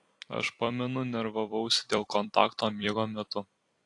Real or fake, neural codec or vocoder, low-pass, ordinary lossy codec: fake; vocoder, 44.1 kHz, 128 mel bands every 256 samples, BigVGAN v2; 10.8 kHz; AAC, 48 kbps